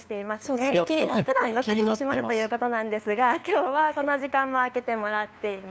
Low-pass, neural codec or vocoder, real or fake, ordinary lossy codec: none; codec, 16 kHz, 2 kbps, FunCodec, trained on LibriTTS, 25 frames a second; fake; none